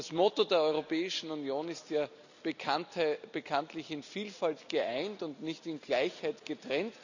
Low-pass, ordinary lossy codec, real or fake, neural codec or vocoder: 7.2 kHz; none; real; none